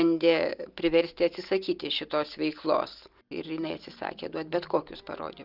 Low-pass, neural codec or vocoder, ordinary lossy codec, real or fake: 5.4 kHz; none; Opus, 24 kbps; real